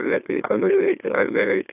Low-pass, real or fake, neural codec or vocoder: 3.6 kHz; fake; autoencoder, 44.1 kHz, a latent of 192 numbers a frame, MeloTTS